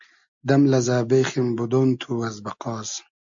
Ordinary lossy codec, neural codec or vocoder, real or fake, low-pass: AAC, 64 kbps; none; real; 7.2 kHz